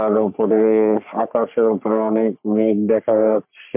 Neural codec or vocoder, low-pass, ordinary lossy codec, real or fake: codec, 44.1 kHz, 3.4 kbps, Pupu-Codec; 3.6 kHz; MP3, 32 kbps; fake